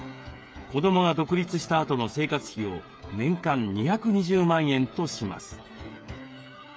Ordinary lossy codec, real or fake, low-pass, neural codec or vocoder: none; fake; none; codec, 16 kHz, 8 kbps, FreqCodec, smaller model